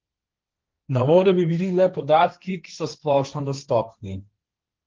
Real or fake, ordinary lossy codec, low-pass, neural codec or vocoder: fake; Opus, 32 kbps; 7.2 kHz; codec, 16 kHz, 1.1 kbps, Voila-Tokenizer